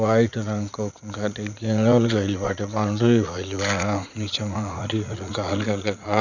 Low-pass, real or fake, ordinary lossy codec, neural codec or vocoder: 7.2 kHz; fake; none; vocoder, 44.1 kHz, 80 mel bands, Vocos